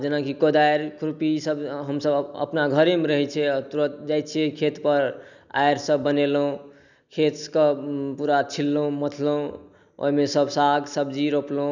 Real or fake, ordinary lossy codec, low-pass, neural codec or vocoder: real; none; 7.2 kHz; none